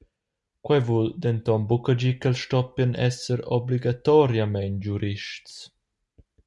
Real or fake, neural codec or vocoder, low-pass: real; none; 10.8 kHz